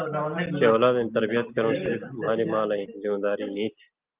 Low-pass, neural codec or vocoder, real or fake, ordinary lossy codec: 3.6 kHz; none; real; Opus, 24 kbps